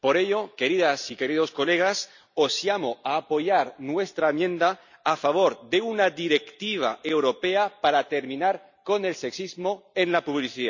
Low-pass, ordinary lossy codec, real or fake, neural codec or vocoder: 7.2 kHz; none; real; none